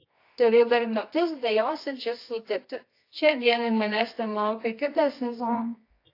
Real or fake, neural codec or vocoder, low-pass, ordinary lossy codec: fake; codec, 24 kHz, 0.9 kbps, WavTokenizer, medium music audio release; 5.4 kHz; AAC, 32 kbps